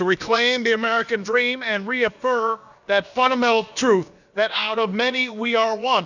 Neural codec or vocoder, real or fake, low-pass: codec, 16 kHz, about 1 kbps, DyCAST, with the encoder's durations; fake; 7.2 kHz